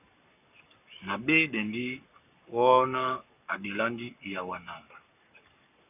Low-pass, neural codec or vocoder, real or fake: 3.6 kHz; codec, 44.1 kHz, 7.8 kbps, Pupu-Codec; fake